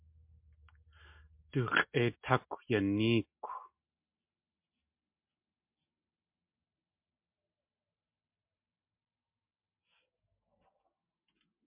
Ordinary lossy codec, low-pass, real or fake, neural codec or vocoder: MP3, 32 kbps; 3.6 kHz; real; none